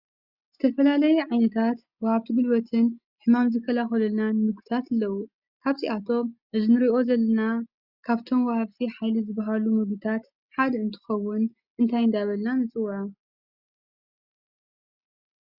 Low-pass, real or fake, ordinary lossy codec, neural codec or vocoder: 5.4 kHz; real; Opus, 64 kbps; none